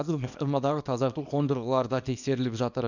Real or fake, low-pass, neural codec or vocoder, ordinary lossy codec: fake; 7.2 kHz; codec, 24 kHz, 0.9 kbps, WavTokenizer, small release; none